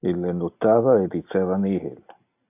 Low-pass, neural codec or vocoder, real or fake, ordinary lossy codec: 3.6 kHz; none; real; AAC, 32 kbps